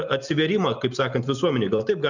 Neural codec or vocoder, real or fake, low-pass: none; real; 7.2 kHz